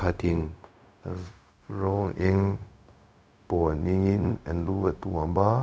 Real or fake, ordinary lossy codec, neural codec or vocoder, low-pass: fake; none; codec, 16 kHz, 0.4 kbps, LongCat-Audio-Codec; none